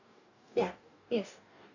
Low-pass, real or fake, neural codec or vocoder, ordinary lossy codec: 7.2 kHz; fake; codec, 44.1 kHz, 2.6 kbps, DAC; none